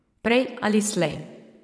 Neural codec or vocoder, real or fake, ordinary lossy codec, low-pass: vocoder, 22.05 kHz, 80 mel bands, WaveNeXt; fake; none; none